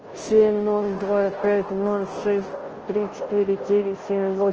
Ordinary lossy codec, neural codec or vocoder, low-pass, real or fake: Opus, 16 kbps; codec, 16 kHz, 0.5 kbps, FunCodec, trained on Chinese and English, 25 frames a second; 7.2 kHz; fake